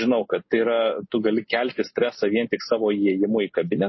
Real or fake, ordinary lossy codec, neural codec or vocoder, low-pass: real; MP3, 24 kbps; none; 7.2 kHz